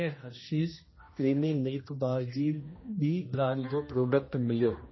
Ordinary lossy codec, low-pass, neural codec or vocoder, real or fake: MP3, 24 kbps; 7.2 kHz; codec, 16 kHz, 1 kbps, X-Codec, HuBERT features, trained on general audio; fake